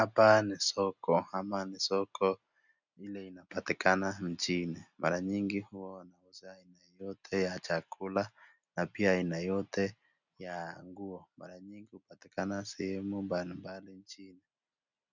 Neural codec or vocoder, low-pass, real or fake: none; 7.2 kHz; real